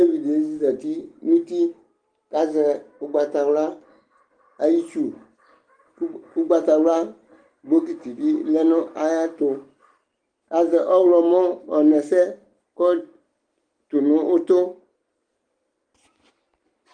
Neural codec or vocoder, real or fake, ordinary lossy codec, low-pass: none; real; Opus, 24 kbps; 9.9 kHz